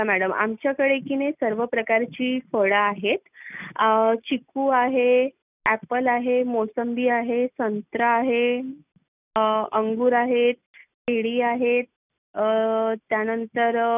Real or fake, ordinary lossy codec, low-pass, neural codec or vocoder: real; none; 3.6 kHz; none